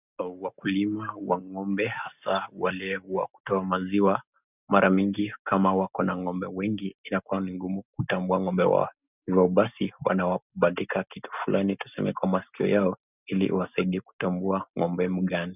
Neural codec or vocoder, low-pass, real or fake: none; 3.6 kHz; real